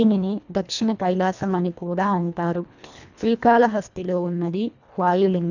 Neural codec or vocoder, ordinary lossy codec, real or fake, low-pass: codec, 24 kHz, 1.5 kbps, HILCodec; none; fake; 7.2 kHz